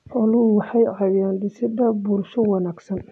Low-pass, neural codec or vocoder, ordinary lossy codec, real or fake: none; none; none; real